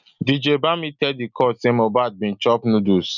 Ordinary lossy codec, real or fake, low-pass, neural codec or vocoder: none; real; 7.2 kHz; none